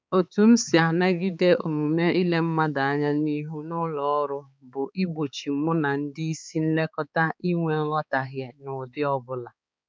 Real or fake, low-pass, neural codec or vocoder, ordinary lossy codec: fake; none; codec, 16 kHz, 4 kbps, X-Codec, HuBERT features, trained on balanced general audio; none